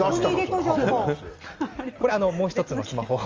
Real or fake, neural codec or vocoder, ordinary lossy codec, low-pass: real; none; Opus, 32 kbps; 7.2 kHz